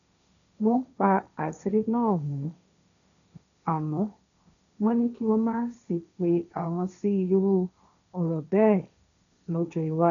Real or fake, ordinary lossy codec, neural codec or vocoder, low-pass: fake; none; codec, 16 kHz, 1.1 kbps, Voila-Tokenizer; 7.2 kHz